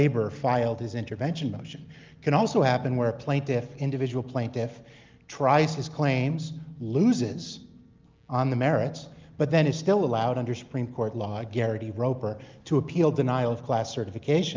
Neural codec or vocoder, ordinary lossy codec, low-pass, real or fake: none; Opus, 24 kbps; 7.2 kHz; real